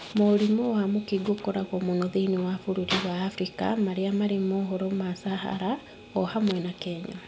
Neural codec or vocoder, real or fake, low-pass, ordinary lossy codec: none; real; none; none